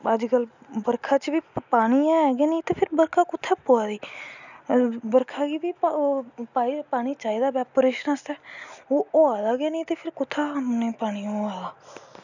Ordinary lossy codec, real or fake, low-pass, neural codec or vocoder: none; real; 7.2 kHz; none